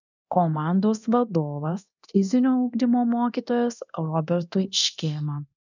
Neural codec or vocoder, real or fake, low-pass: codec, 24 kHz, 0.9 kbps, DualCodec; fake; 7.2 kHz